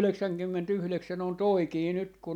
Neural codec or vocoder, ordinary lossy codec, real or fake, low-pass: none; none; real; 19.8 kHz